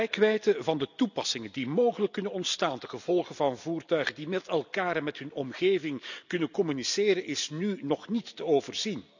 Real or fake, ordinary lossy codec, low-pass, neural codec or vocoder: real; none; 7.2 kHz; none